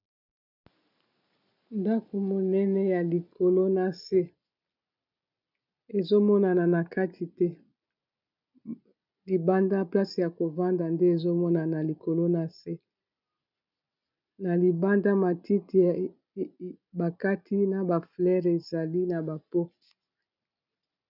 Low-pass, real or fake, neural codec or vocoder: 5.4 kHz; real; none